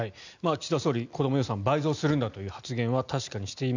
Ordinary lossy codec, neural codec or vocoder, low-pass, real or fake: none; none; 7.2 kHz; real